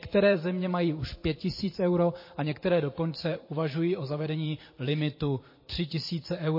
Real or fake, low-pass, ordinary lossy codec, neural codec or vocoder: fake; 5.4 kHz; MP3, 24 kbps; vocoder, 44.1 kHz, 128 mel bands every 512 samples, BigVGAN v2